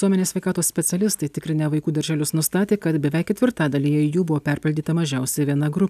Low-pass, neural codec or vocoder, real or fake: 14.4 kHz; none; real